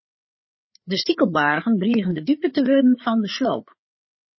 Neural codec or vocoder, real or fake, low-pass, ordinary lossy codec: codec, 16 kHz, 4 kbps, FreqCodec, larger model; fake; 7.2 kHz; MP3, 24 kbps